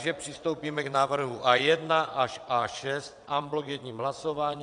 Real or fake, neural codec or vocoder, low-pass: fake; vocoder, 22.05 kHz, 80 mel bands, WaveNeXt; 9.9 kHz